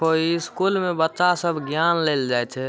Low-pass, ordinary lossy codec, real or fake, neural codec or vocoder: none; none; real; none